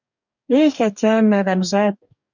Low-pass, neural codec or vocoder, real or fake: 7.2 kHz; codec, 44.1 kHz, 2.6 kbps, DAC; fake